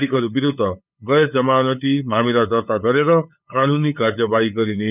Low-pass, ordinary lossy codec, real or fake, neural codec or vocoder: 3.6 kHz; none; fake; codec, 16 kHz, 8 kbps, FunCodec, trained on LibriTTS, 25 frames a second